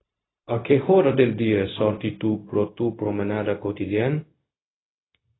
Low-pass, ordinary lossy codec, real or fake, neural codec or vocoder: 7.2 kHz; AAC, 16 kbps; fake; codec, 16 kHz, 0.4 kbps, LongCat-Audio-Codec